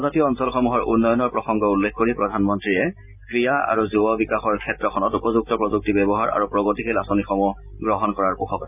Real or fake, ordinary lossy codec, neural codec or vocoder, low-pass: real; none; none; 3.6 kHz